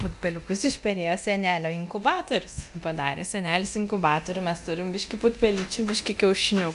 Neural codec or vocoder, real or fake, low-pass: codec, 24 kHz, 0.9 kbps, DualCodec; fake; 10.8 kHz